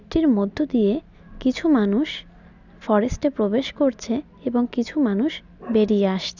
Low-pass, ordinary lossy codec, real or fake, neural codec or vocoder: 7.2 kHz; none; real; none